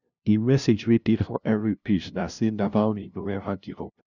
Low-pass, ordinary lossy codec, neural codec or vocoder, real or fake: 7.2 kHz; none; codec, 16 kHz, 0.5 kbps, FunCodec, trained on LibriTTS, 25 frames a second; fake